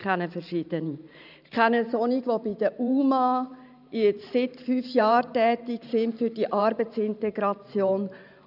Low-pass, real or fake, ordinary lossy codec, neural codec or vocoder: 5.4 kHz; fake; none; vocoder, 44.1 kHz, 128 mel bands every 512 samples, BigVGAN v2